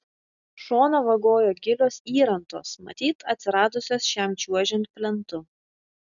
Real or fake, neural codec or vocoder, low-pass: real; none; 7.2 kHz